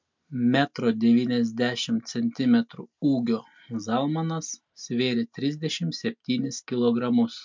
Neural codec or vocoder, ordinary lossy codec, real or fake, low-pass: none; MP3, 64 kbps; real; 7.2 kHz